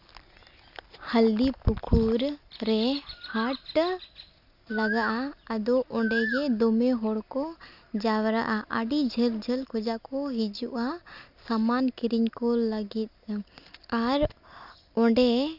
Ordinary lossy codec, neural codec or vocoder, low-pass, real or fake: Opus, 64 kbps; none; 5.4 kHz; real